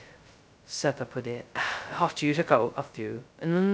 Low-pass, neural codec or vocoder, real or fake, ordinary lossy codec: none; codec, 16 kHz, 0.2 kbps, FocalCodec; fake; none